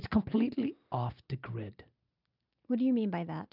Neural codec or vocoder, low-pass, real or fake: none; 5.4 kHz; real